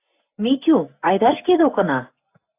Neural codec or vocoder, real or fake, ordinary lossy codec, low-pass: none; real; AAC, 24 kbps; 3.6 kHz